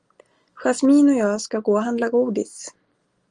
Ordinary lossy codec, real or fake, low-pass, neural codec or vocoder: Opus, 24 kbps; real; 9.9 kHz; none